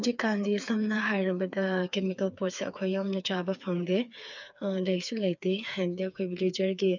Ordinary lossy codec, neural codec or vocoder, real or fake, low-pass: none; codec, 16 kHz, 4 kbps, FreqCodec, smaller model; fake; 7.2 kHz